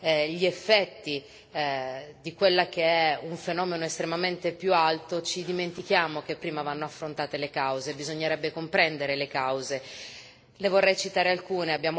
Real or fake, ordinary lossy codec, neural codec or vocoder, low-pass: real; none; none; none